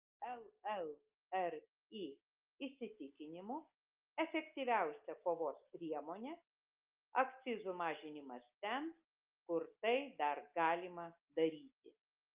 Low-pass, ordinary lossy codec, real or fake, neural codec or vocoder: 3.6 kHz; Opus, 24 kbps; real; none